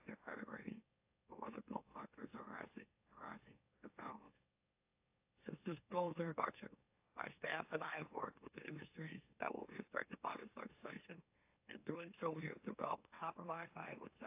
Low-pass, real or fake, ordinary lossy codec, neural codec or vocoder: 3.6 kHz; fake; AAC, 32 kbps; autoencoder, 44.1 kHz, a latent of 192 numbers a frame, MeloTTS